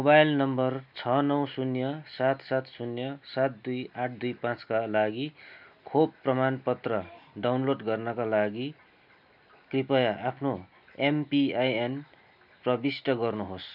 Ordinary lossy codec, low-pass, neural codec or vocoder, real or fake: none; 5.4 kHz; none; real